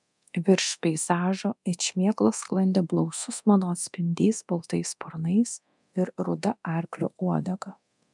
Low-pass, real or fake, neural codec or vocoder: 10.8 kHz; fake; codec, 24 kHz, 0.9 kbps, DualCodec